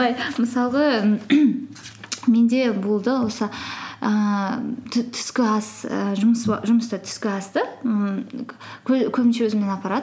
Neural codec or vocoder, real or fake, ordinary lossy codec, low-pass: none; real; none; none